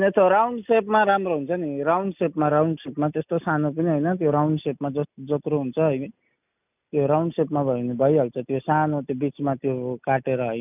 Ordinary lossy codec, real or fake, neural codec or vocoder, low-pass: none; real; none; 3.6 kHz